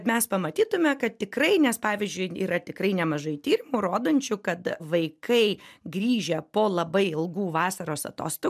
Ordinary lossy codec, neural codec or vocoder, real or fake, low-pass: MP3, 96 kbps; none; real; 14.4 kHz